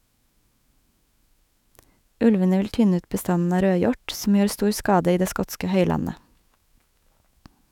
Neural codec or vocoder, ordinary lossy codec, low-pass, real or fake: autoencoder, 48 kHz, 128 numbers a frame, DAC-VAE, trained on Japanese speech; none; 19.8 kHz; fake